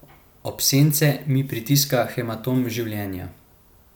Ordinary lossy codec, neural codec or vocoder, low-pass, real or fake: none; none; none; real